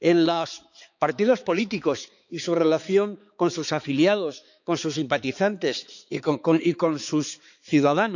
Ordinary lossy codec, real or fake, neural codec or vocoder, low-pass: none; fake; codec, 16 kHz, 4 kbps, X-Codec, HuBERT features, trained on balanced general audio; 7.2 kHz